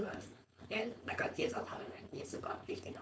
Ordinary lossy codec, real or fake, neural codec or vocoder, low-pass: none; fake; codec, 16 kHz, 4.8 kbps, FACodec; none